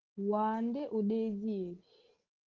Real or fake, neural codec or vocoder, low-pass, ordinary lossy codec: real; none; 7.2 kHz; Opus, 16 kbps